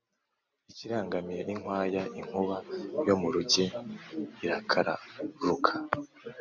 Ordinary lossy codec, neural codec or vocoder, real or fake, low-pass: MP3, 64 kbps; none; real; 7.2 kHz